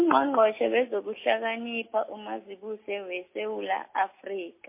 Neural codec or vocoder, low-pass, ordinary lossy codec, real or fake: none; 3.6 kHz; MP3, 24 kbps; real